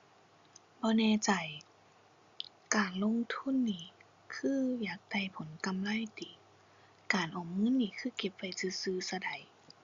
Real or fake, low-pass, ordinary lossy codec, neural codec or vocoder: real; 7.2 kHz; Opus, 64 kbps; none